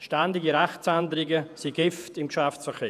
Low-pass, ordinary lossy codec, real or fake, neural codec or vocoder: 14.4 kHz; none; fake; vocoder, 48 kHz, 128 mel bands, Vocos